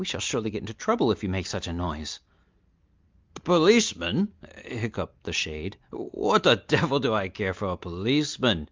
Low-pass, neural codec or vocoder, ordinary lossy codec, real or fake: 7.2 kHz; none; Opus, 24 kbps; real